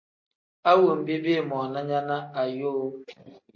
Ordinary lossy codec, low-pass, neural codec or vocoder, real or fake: MP3, 32 kbps; 7.2 kHz; none; real